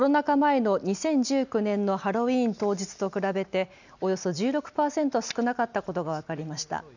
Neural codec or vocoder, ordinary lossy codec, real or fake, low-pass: none; none; real; 7.2 kHz